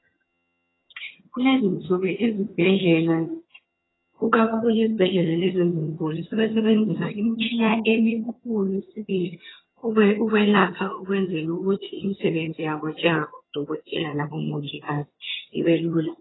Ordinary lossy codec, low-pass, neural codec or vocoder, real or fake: AAC, 16 kbps; 7.2 kHz; vocoder, 22.05 kHz, 80 mel bands, HiFi-GAN; fake